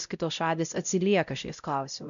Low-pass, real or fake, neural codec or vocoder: 7.2 kHz; fake; codec, 16 kHz, 0.5 kbps, X-Codec, WavLM features, trained on Multilingual LibriSpeech